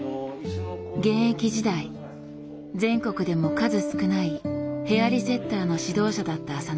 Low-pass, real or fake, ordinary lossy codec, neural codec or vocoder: none; real; none; none